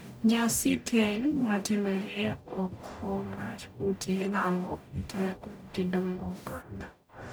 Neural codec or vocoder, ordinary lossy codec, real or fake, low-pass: codec, 44.1 kHz, 0.9 kbps, DAC; none; fake; none